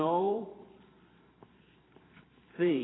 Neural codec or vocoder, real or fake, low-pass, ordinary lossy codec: vocoder, 44.1 kHz, 128 mel bands every 512 samples, BigVGAN v2; fake; 7.2 kHz; AAC, 16 kbps